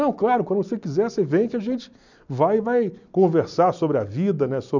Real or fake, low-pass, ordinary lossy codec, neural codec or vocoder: real; 7.2 kHz; none; none